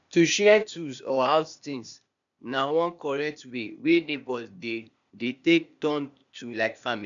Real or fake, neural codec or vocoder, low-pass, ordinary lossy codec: fake; codec, 16 kHz, 0.8 kbps, ZipCodec; 7.2 kHz; none